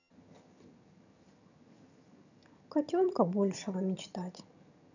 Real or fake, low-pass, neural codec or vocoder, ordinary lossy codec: fake; 7.2 kHz; vocoder, 22.05 kHz, 80 mel bands, HiFi-GAN; none